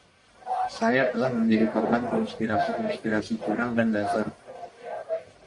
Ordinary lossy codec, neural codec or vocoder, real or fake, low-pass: Opus, 64 kbps; codec, 44.1 kHz, 1.7 kbps, Pupu-Codec; fake; 10.8 kHz